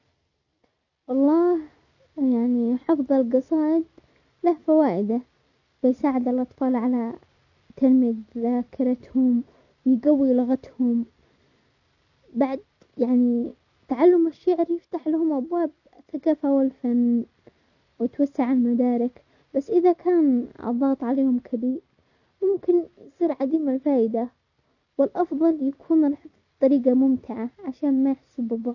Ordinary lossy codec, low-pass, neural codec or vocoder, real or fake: none; 7.2 kHz; none; real